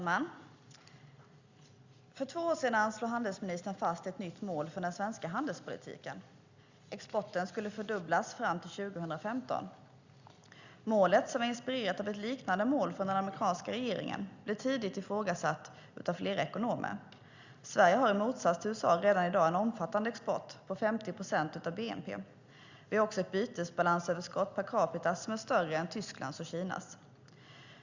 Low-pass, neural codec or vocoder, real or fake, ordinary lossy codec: 7.2 kHz; none; real; Opus, 64 kbps